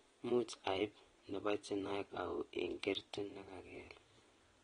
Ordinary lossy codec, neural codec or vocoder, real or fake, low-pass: AAC, 32 kbps; vocoder, 22.05 kHz, 80 mel bands, WaveNeXt; fake; 9.9 kHz